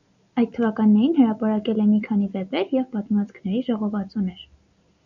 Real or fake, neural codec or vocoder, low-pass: real; none; 7.2 kHz